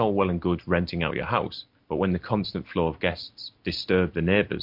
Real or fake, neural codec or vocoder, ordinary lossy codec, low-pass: real; none; MP3, 48 kbps; 5.4 kHz